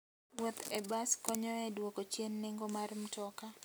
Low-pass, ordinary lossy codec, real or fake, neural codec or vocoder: none; none; real; none